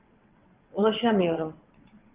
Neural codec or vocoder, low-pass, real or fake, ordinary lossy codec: vocoder, 22.05 kHz, 80 mel bands, WaveNeXt; 3.6 kHz; fake; Opus, 24 kbps